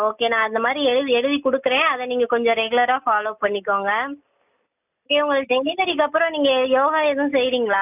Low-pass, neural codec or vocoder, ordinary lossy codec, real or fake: 3.6 kHz; none; none; real